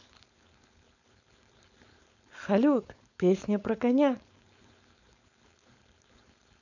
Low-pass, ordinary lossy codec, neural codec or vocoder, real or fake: 7.2 kHz; none; codec, 16 kHz, 4.8 kbps, FACodec; fake